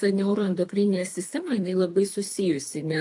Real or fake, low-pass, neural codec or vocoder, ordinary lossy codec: fake; 10.8 kHz; codec, 24 kHz, 3 kbps, HILCodec; AAC, 64 kbps